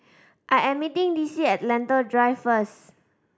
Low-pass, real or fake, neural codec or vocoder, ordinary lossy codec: none; real; none; none